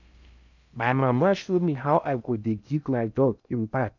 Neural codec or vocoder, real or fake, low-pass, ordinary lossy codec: codec, 16 kHz in and 24 kHz out, 0.6 kbps, FocalCodec, streaming, 4096 codes; fake; 7.2 kHz; none